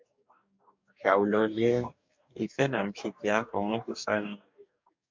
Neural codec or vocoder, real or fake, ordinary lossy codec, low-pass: codec, 44.1 kHz, 2.6 kbps, DAC; fake; MP3, 64 kbps; 7.2 kHz